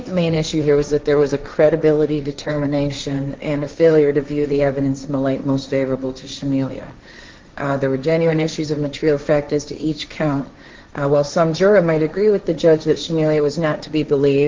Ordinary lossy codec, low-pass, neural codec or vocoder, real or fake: Opus, 24 kbps; 7.2 kHz; codec, 16 kHz, 1.1 kbps, Voila-Tokenizer; fake